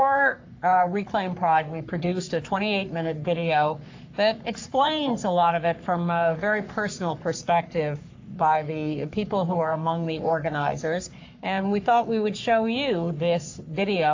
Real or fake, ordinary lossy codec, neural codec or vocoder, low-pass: fake; AAC, 48 kbps; codec, 44.1 kHz, 3.4 kbps, Pupu-Codec; 7.2 kHz